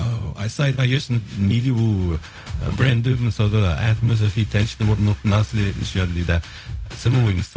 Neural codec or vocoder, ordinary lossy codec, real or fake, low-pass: codec, 16 kHz, 0.4 kbps, LongCat-Audio-Codec; none; fake; none